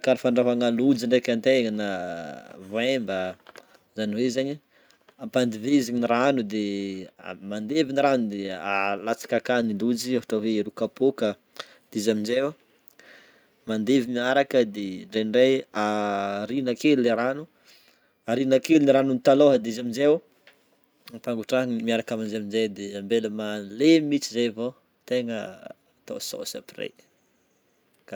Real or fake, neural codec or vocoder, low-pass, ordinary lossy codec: real; none; none; none